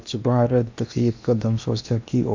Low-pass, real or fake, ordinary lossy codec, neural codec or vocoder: 7.2 kHz; fake; MP3, 64 kbps; codec, 16 kHz in and 24 kHz out, 0.8 kbps, FocalCodec, streaming, 65536 codes